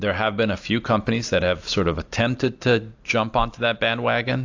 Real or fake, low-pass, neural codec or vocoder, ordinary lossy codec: real; 7.2 kHz; none; AAC, 48 kbps